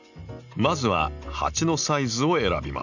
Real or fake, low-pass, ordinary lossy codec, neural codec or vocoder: real; 7.2 kHz; none; none